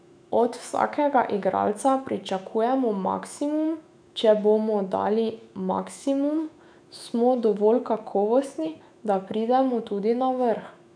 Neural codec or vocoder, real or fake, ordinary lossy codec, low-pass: autoencoder, 48 kHz, 128 numbers a frame, DAC-VAE, trained on Japanese speech; fake; none; 9.9 kHz